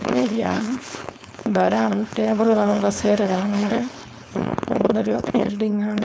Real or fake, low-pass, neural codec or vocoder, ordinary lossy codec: fake; none; codec, 16 kHz, 4.8 kbps, FACodec; none